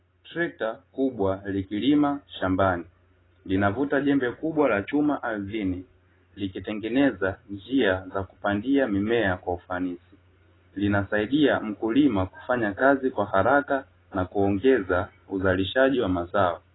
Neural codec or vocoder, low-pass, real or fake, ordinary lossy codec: none; 7.2 kHz; real; AAC, 16 kbps